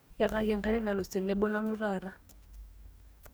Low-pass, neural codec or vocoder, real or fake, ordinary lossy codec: none; codec, 44.1 kHz, 2.6 kbps, DAC; fake; none